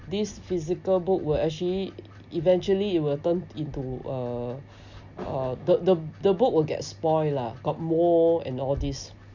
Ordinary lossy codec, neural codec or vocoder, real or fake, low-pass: none; none; real; 7.2 kHz